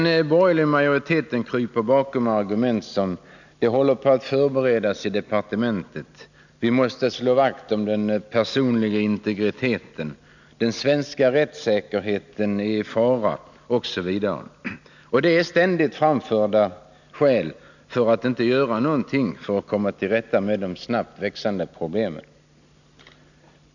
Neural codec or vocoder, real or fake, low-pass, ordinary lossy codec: none; real; 7.2 kHz; none